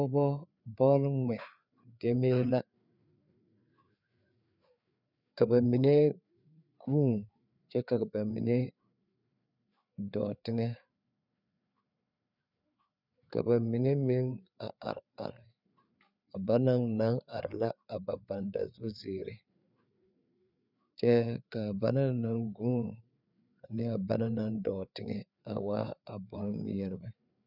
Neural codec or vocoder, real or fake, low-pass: codec, 16 kHz, 4 kbps, FreqCodec, larger model; fake; 5.4 kHz